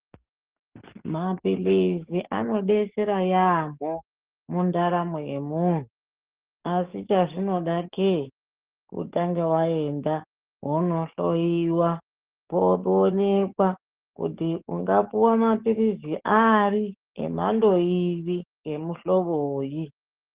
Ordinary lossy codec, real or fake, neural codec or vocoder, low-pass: Opus, 16 kbps; fake; codec, 44.1 kHz, 7.8 kbps, DAC; 3.6 kHz